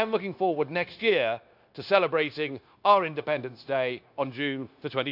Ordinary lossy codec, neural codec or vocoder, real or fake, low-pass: AAC, 48 kbps; codec, 16 kHz, 0.9 kbps, LongCat-Audio-Codec; fake; 5.4 kHz